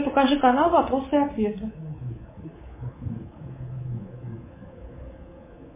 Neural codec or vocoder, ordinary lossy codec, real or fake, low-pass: codec, 24 kHz, 3.1 kbps, DualCodec; MP3, 16 kbps; fake; 3.6 kHz